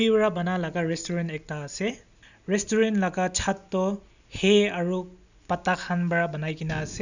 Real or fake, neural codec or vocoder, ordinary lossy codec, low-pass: real; none; none; 7.2 kHz